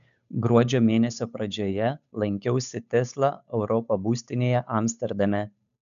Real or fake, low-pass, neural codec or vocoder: fake; 7.2 kHz; codec, 16 kHz, 8 kbps, FunCodec, trained on Chinese and English, 25 frames a second